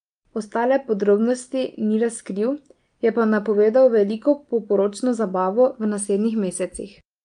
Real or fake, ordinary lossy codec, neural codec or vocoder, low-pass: real; Opus, 32 kbps; none; 9.9 kHz